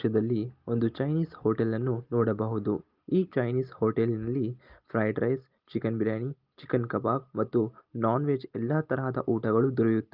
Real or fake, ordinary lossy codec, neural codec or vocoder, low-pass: real; Opus, 32 kbps; none; 5.4 kHz